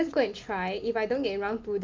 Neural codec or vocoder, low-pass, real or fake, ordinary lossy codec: none; 7.2 kHz; real; Opus, 32 kbps